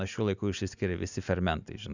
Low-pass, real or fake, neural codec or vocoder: 7.2 kHz; real; none